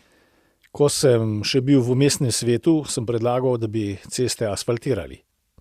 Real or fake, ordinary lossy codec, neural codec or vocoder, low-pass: real; Opus, 64 kbps; none; 14.4 kHz